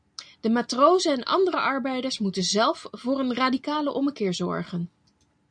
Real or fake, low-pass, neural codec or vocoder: real; 9.9 kHz; none